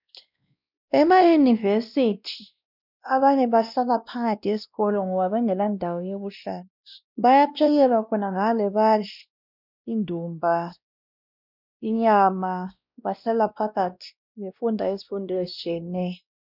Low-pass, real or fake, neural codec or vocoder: 5.4 kHz; fake; codec, 16 kHz, 1 kbps, X-Codec, WavLM features, trained on Multilingual LibriSpeech